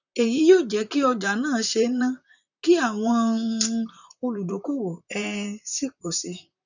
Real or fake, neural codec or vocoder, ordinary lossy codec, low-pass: fake; vocoder, 44.1 kHz, 128 mel bands, Pupu-Vocoder; none; 7.2 kHz